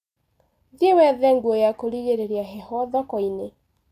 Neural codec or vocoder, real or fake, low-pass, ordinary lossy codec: none; real; 14.4 kHz; none